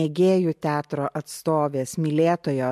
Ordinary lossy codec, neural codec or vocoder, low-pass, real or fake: MP3, 64 kbps; none; 14.4 kHz; real